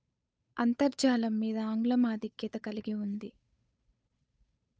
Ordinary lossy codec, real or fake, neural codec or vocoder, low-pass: none; fake; codec, 16 kHz, 8 kbps, FunCodec, trained on Chinese and English, 25 frames a second; none